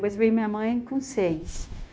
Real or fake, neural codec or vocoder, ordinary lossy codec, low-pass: fake; codec, 16 kHz, 0.9 kbps, LongCat-Audio-Codec; none; none